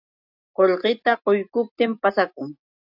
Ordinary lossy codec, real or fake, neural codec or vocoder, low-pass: AAC, 48 kbps; real; none; 5.4 kHz